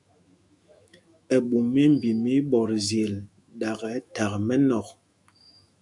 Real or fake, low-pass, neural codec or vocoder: fake; 10.8 kHz; autoencoder, 48 kHz, 128 numbers a frame, DAC-VAE, trained on Japanese speech